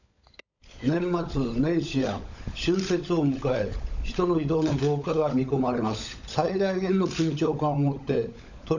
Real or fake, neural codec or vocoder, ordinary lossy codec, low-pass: fake; codec, 16 kHz, 16 kbps, FunCodec, trained on LibriTTS, 50 frames a second; none; 7.2 kHz